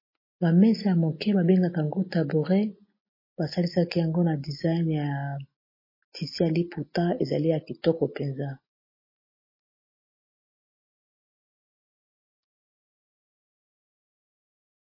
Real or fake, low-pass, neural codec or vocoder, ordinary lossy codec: real; 5.4 kHz; none; MP3, 24 kbps